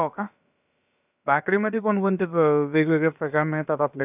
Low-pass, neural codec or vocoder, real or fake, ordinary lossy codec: 3.6 kHz; codec, 16 kHz, about 1 kbps, DyCAST, with the encoder's durations; fake; none